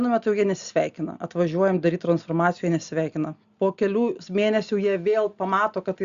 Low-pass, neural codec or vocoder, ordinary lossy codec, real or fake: 7.2 kHz; none; Opus, 64 kbps; real